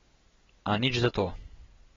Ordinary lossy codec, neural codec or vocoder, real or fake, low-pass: AAC, 24 kbps; none; real; 7.2 kHz